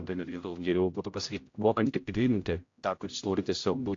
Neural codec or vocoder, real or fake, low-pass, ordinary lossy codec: codec, 16 kHz, 0.5 kbps, X-Codec, HuBERT features, trained on general audio; fake; 7.2 kHz; AAC, 48 kbps